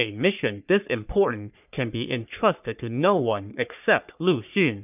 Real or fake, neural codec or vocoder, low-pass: fake; codec, 44.1 kHz, 3.4 kbps, Pupu-Codec; 3.6 kHz